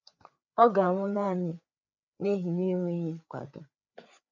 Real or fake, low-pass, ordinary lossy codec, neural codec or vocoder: fake; 7.2 kHz; none; codec, 16 kHz, 4 kbps, FreqCodec, larger model